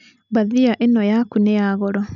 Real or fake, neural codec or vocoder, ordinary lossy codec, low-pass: real; none; none; 7.2 kHz